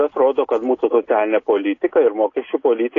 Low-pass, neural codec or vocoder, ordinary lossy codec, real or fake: 7.2 kHz; none; AAC, 32 kbps; real